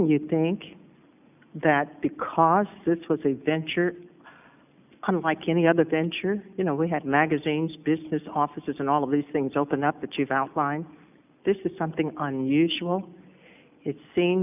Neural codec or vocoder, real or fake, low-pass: none; real; 3.6 kHz